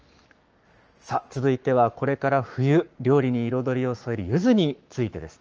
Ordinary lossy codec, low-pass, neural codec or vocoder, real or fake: Opus, 24 kbps; 7.2 kHz; codec, 44.1 kHz, 7.8 kbps, Pupu-Codec; fake